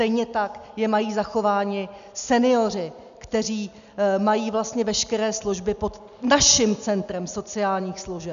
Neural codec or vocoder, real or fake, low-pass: none; real; 7.2 kHz